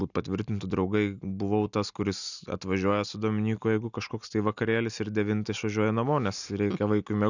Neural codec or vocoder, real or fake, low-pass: none; real; 7.2 kHz